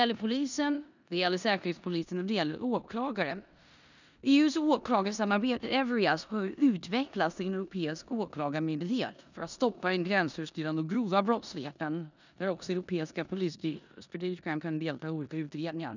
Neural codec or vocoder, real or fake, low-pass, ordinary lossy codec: codec, 16 kHz in and 24 kHz out, 0.9 kbps, LongCat-Audio-Codec, four codebook decoder; fake; 7.2 kHz; none